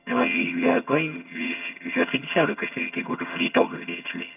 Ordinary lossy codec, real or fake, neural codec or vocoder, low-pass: none; fake; vocoder, 22.05 kHz, 80 mel bands, HiFi-GAN; 3.6 kHz